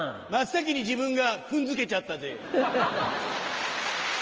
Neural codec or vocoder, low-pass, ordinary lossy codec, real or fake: none; 7.2 kHz; Opus, 24 kbps; real